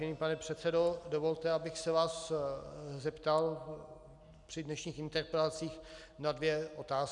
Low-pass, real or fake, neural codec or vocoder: 10.8 kHz; real; none